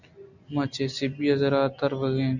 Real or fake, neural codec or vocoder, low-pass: real; none; 7.2 kHz